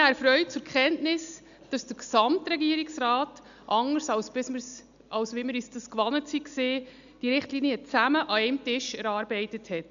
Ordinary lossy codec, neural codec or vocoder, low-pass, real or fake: none; none; 7.2 kHz; real